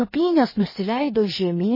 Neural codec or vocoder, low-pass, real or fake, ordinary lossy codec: codec, 16 kHz in and 24 kHz out, 1.1 kbps, FireRedTTS-2 codec; 5.4 kHz; fake; MP3, 24 kbps